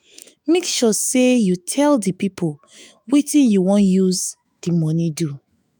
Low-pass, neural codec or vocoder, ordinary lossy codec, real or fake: none; autoencoder, 48 kHz, 128 numbers a frame, DAC-VAE, trained on Japanese speech; none; fake